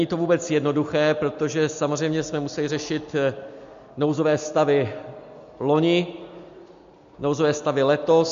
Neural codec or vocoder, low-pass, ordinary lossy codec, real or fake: none; 7.2 kHz; MP3, 48 kbps; real